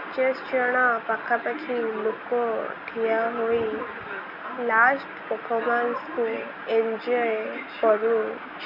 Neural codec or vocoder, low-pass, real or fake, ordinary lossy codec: none; 5.4 kHz; real; none